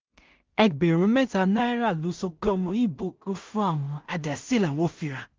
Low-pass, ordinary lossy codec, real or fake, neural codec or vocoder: 7.2 kHz; Opus, 24 kbps; fake; codec, 16 kHz in and 24 kHz out, 0.4 kbps, LongCat-Audio-Codec, two codebook decoder